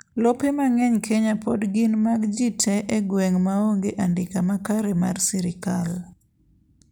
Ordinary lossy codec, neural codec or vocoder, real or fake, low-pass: none; none; real; none